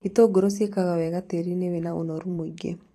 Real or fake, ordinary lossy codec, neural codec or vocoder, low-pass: fake; AAC, 48 kbps; vocoder, 44.1 kHz, 128 mel bands every 256 samples, BigVGAN v2; 14.4 kHz